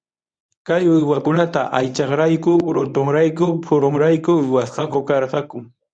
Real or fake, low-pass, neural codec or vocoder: fake; 9.9 kHz; codec, 24 kHz, 0.9 kbps, WavTokenizer, medium speech release version 1